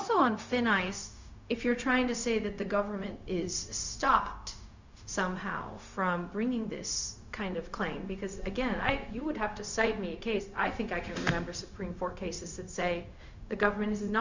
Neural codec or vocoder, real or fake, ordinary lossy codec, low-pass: codec, 16 kHz, 0.4 kbps, LongCat-Audio-Codec; fake; Opus, 64 kbps; 7.2 kHz